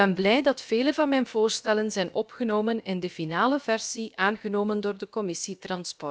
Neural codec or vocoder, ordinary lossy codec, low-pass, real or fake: codec, 16 kHz, about 1 kbps, DyCAST, with the encoder's durations; none; none; fake